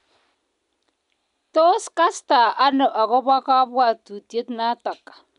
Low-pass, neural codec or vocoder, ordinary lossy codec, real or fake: 10.8 kHz; none; none; real